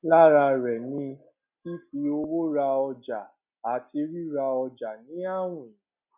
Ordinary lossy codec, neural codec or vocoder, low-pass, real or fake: none; none; 3.6 kHz; real